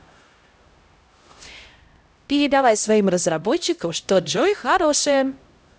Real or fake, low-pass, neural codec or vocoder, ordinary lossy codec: fake; none; codec, 16 kHz, 0.5 kbps, X-Codec, HuBERT features, trained on LibriSpeech; none